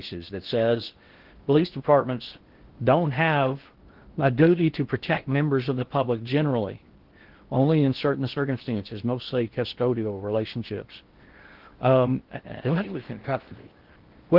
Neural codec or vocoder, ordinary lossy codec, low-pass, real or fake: codec, 16 kHz in and 24 kHz out, 0.6 kbps, FocalCodec, streaming, 4096 codes; Opus, 16 kbps; 5.4 kHz; fake